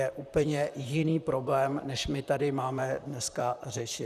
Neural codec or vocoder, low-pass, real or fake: vocoder, 44.1 kHz, 128 mel bands, Pupu-Vocoder; 14.4 kHz; fake